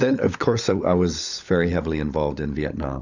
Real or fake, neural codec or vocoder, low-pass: fake; codec, 16 kHz, 16 kbps, FunCodec, trained on LibriTTS, 50 frames a second; 7.2 kHz